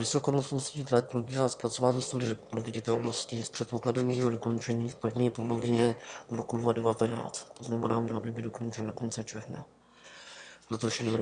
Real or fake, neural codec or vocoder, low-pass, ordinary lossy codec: fake; autoencoder, 22.05 kHz, a latent of 192 numbers a frame, VITS, trained on one speaker; 9.9 kHz; Opus, 64 kbps